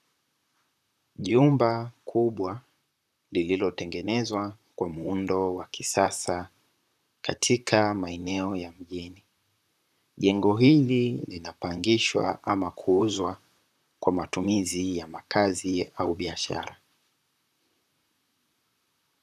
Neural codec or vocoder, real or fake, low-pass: vocoder, 44.1 kHz, 128 mel bands, Pupu-Vocoder; fake; 14.4 kHz